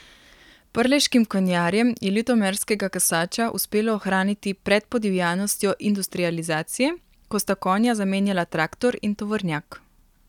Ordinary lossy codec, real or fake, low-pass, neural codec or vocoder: none; real; 19.8 kHz; none